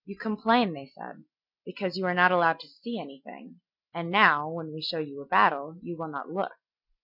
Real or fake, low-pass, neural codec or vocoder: real; 5.4 kHz; none